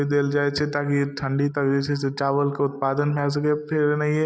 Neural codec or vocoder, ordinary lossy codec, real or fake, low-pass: none; none; real; none